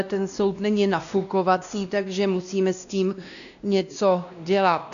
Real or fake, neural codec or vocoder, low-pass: fake; codec, 16 kHz, 1 kbps, X-Codec, WavLM features, trained on Multilingual LibriSpeech; 7.2 kHz